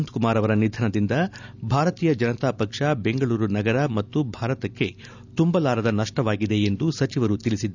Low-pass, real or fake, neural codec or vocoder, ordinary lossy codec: 7.2 kHz; real; none; none